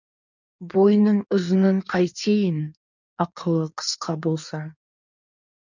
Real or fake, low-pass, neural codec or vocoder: fake; 7.2 kHz; codec, 16 kHz in and 24 kHz out, 1.1 kbps, FireRedTTS-2 codec